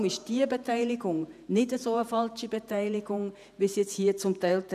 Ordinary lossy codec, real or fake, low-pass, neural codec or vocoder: none; fake; 14.4 kHz; vocoder, 48 kHz, 128 mel bands, Vocos